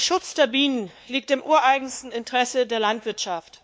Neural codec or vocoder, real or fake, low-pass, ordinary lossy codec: codec, 16 kHz, 2 kbps, X-Codec, WavLM features, trained on Multilingual LibriSpeech; fake; none; none